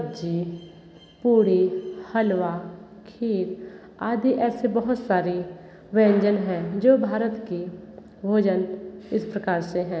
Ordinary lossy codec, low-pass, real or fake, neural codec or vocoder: none; none; real; none